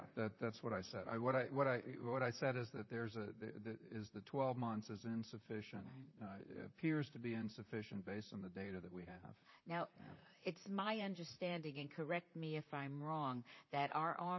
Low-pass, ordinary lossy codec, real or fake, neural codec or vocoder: 7.2 kHz; MP3, 24 kbps; fake; vocoder, 44.1 kHz, 128 mel bands, Pupu-Vocoder